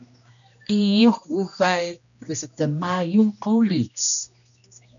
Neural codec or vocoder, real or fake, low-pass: codec, 16 kHz, 1 kbps, X-Codec, HuBERT features, trained on general audio; fake; 7.2 kHz